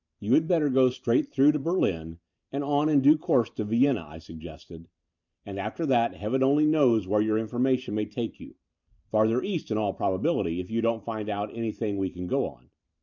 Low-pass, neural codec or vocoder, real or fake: 7.2 kHz; vocoder, 44.1 kHz, 128 mel bands every 512 samples, BigVGAN v2; fake